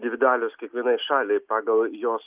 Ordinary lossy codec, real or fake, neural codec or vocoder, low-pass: Opus, 24 kbps; real; none; 3.6 kHz